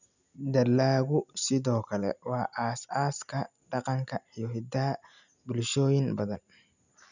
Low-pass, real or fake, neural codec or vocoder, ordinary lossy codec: 7.2 kHz; real; none; none